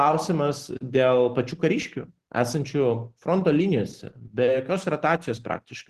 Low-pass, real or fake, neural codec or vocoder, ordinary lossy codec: 14.4 kHz; real; none; Opus, 16 kbps